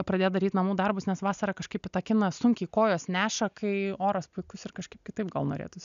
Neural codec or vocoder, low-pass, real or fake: none; 7.2 kHz; real